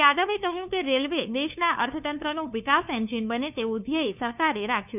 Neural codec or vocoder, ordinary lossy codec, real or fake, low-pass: codec, 16 kHz, 2 kbps, FunCodec, trained on LibriTTS, 25 frames a second; none; fake; 3.6 kHz